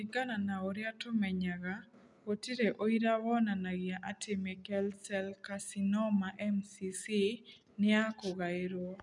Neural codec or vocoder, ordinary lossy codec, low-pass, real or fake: none; none; 10.8 kHz; real